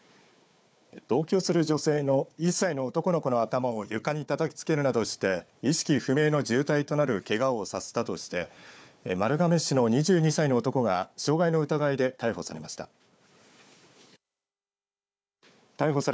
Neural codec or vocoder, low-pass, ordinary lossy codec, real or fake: codec, 16 kHz, 4 kbps, FunCodec, trained on Chinese and English, 50 frames a second; none; none; fake